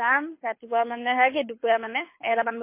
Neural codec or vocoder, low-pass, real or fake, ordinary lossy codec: codec, 24 kHz, 6 kbps, HILCodec; 3.6 kHz; fake; MP3, 24 kbps